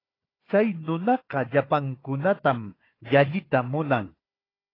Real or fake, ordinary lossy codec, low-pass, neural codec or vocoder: fake; AAC, 24 kbps; 5.4 kHz; codec, 16 kHz, 4 kbps, FunCodec, trained on Chinese and English, 50 frames a second